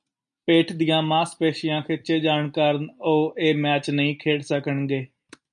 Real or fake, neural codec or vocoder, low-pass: real; none; 9.9 kHz